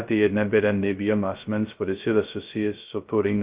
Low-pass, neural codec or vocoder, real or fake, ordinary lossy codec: 3.6 kHz; codec, 16 kHz, 0.2 kbps, FocalCodec; fake; Opus, 32 kbps